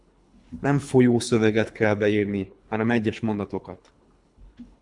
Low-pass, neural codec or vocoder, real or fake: 10.8 kHz; codec, 24 kHz, 3 kbps, HILCodec; fake